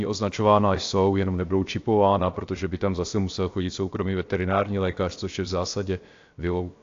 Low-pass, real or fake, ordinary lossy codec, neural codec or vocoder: 7.2 kHz; fake; AAC, 48 kbps; codec, 16 kHz, about 1 kbps, DyCAST, with the encoder's durations